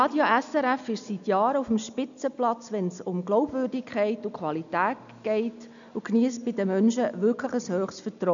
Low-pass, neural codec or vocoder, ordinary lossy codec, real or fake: 7.2 kHz; none; none; real